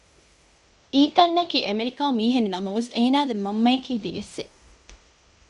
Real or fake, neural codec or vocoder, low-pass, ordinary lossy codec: fake; codec, 16 kHz in and 24 kHz out, 0.9 kbps, LongCat-Audio-Codec, fine tuned four codebook decoder; 10.8 kHz; Opus, 64 kbps